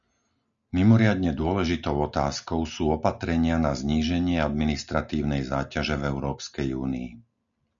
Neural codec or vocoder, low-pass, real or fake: none; 7.2 kHz; real